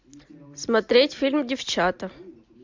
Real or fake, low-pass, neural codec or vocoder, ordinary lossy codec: real; 7.2 kHz; none; MP3, 64 kbps